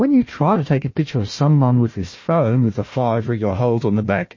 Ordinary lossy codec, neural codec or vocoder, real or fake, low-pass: MP3, 32 kbps; codec, 16 kHz, 1 kbps, FunCodec, trained on Chinese and English, 50 frames a second; fake; 7.2 kHz